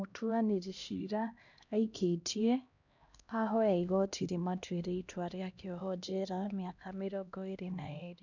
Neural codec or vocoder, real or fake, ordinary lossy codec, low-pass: codec, 16 kHz, 1 kbps, X-Codec, HuBERT features, trained on LibriSpeech; fake; none; 7.2 kHz